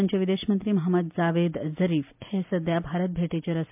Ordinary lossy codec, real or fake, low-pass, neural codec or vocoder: MP3, 32 kbps; real; 3.6 kHz; none